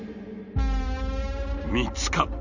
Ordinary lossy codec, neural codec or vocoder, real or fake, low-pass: none; none; real; 7.2 kHz